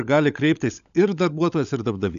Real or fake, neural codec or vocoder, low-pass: fake; codec, 16 kHz, 16 kbps, FunCodec, trained on LibriTTS, 50 frames a second; 7.2 kHz